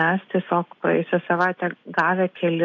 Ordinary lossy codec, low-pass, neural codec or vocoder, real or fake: AAC, 48 kbps; 7.2 kHz; none; real